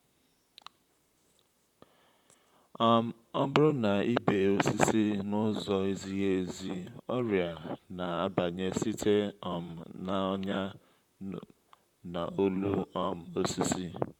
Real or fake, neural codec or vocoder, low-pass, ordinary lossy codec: fake; vocoder, 44.1 kHz, 128 mel bands, Pupu-Vocoder; 19.8 kHz; none